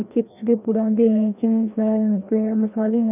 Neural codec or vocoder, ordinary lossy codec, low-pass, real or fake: codec, 16 kHz, 1 kbps, FreqCodec, larger model; none; 3.6 kHz; fake